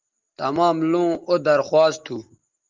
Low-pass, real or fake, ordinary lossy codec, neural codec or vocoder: 7.2 kHz; real; Opus, 32 kbps; none